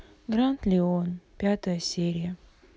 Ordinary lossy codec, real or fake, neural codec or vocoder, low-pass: none; real; none; none